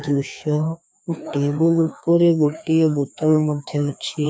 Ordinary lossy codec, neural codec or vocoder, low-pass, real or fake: none; codec, 16 kHz, 2 kbps, FreqCodec, larger model; none; fake